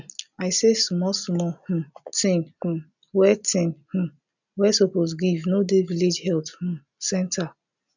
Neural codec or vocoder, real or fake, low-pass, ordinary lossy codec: none; real; 7.2 kHz; none